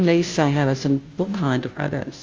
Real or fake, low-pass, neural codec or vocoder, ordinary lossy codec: fake; 7.2 kHz; codec, 16 kHz, 0.5 kbps, FunCodec, trained on Chinese and English, 25 frames a second; Opus, 32 kbps